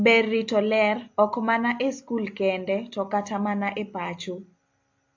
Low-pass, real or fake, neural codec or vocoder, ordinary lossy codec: 7.2 kHz; real; none; AAC, 48 kbps